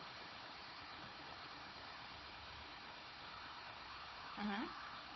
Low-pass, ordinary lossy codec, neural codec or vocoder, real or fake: 7.2 kHz; MP3, 24 kbps; codec, 16 kHz, 4 kbps, FunCodec, trained on Chinese and English, 50 frames a second; fake